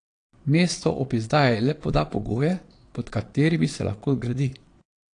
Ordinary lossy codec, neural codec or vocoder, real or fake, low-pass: AAC, 48 kbps; vocoder, 22.05 kHz, 80 mel bands, Vocos; fake; 9.9 kHz